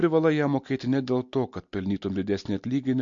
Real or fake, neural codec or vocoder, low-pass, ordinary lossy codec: real; none; 7.2 kHz; MP3, 48 kbps